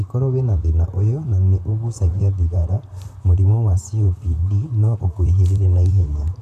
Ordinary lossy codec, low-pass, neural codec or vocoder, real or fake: none; 14.4 kHz; vocoder, 44.1 kHz, 128 mel bands, Pupu-Vocoder; fake